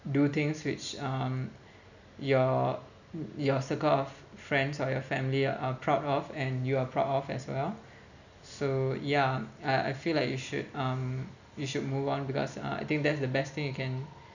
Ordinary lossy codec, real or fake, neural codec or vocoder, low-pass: none; real; none; 7.2 kHz